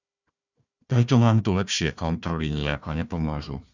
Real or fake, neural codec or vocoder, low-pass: fake; codec, 16 kHz, 1 kbps, FunCodec, trained on Chinese and English, 50 frames a second; 7.2 kHz